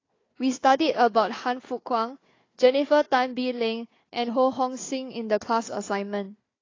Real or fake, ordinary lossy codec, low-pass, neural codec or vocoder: fake; AAC, 32 kbps; 7.2 kHz; codec, 16 kHz, 4 kbps, FunCodec, trained on Chinese and English, 50 frames a second